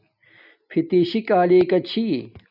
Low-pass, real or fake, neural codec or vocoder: 5.4 kHz; real; none